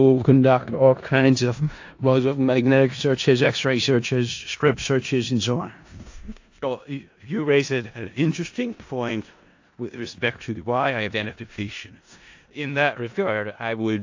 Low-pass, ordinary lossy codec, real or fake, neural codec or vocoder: 7.2 kHz; AAC, 48 kbps; fake; codec, 16 kHz in and 24 kHz out, 0.4 kbps, LongCat-Audio-Codec, four codebook decoder